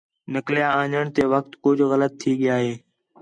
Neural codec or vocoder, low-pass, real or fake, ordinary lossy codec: none; 9.9 kHz; real; MP3, 96 kbps